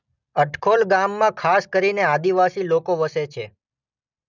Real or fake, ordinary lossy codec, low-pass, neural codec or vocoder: real; none; 7.2 kHz; none